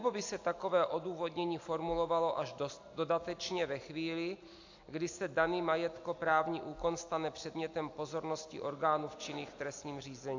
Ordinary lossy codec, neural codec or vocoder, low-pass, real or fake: AAC, 48 kbps; none; 7.2 kHz; real